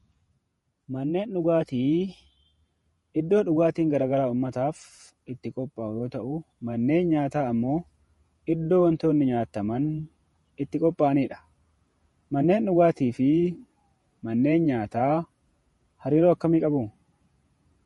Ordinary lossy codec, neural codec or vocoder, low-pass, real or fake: MP3, 48 kbps; vocoder, 48 kHz, 128 mel bands, Vocos; 19.8 kHz; fake